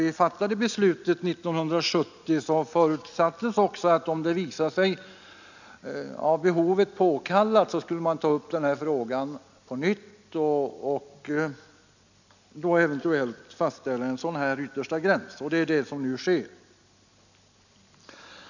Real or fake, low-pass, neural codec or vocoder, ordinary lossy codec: real; 7.2 kHz; none; none